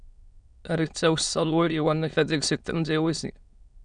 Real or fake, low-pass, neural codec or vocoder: fake; 9.9 kHz; autoencoder, 22.05 kHz, a latent of 192 numbers a frame, VITS, trained on many speakers